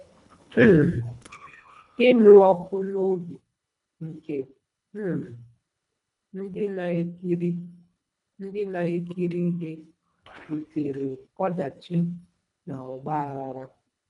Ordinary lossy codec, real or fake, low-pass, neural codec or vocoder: none; fake; 10.8 kHz; codec, 24 kHz, 1.5 kbps, HILCodec